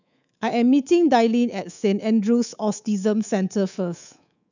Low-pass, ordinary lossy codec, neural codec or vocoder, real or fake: 7.2 kHz; none; codec, 24 kHz, 3.1 kbps, DualCodec; fake